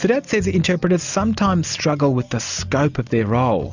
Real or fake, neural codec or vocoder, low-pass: real; none; 7.2 kHz